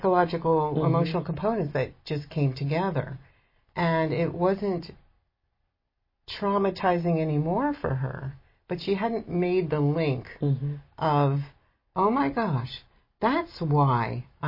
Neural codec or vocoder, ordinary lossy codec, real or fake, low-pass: none; MP3, 24 kbps; real; 5.4 kHz